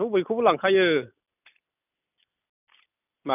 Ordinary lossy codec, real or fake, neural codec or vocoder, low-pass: none; real; none; 3.6 kHz